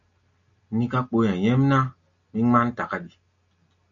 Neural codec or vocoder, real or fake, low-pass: none; real; 7.2 kHz